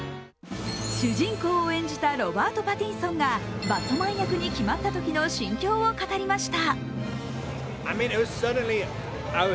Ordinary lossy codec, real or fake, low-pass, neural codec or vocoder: none; real; none; none